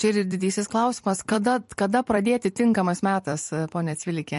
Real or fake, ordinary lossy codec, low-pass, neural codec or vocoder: fake; MP3, 48 kbps; 14.4 kHz; vocoder, 44.1 kHz, 128 mel bands every 256 samples, BigVGAN v2